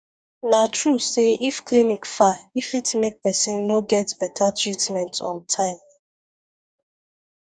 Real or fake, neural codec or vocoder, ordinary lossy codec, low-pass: fake; codec, 44.1 kHz, 2.6 kbps, DAC; none; 9.9 kHz